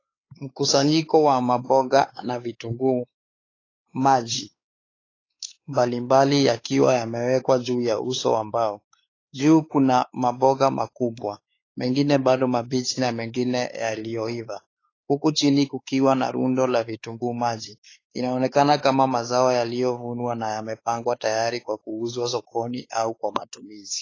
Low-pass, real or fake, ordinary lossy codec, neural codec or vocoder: 7.2 kHz; fake; AAC, 32 kbps; codec, 16 kHz, 4 kbps, X-Codec, WavLM features, trained on Multilingual LibriSpeech